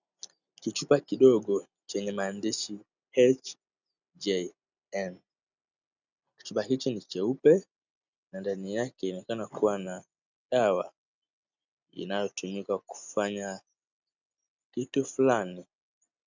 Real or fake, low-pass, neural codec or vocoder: real; 7.2 kHz; none